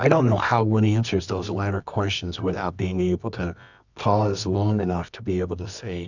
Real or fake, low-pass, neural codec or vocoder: fake; 7.2 kHz; codec, 24 kHz, 0.9 kbps, WavTokenizer, medium music audio release